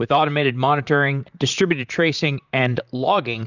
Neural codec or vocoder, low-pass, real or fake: vocoder, 44.1 kHz, 128 mel bands, Pupu-Vocoder; 7.2 kHz; fake